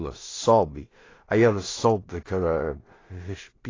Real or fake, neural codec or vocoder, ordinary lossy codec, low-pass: fake; codec, 16 kHz in and 24 kHz out, 0.4 kbps, LongCat-Audio-Codec, two codebook decoder; AAC, 32 kbps; 7.2 kHz